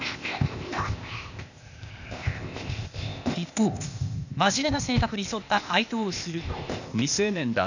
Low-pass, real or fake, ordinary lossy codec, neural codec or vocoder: 7.2 kHz; fake; none; codec, 16 kHz, 0.8 kbps, ZipCodec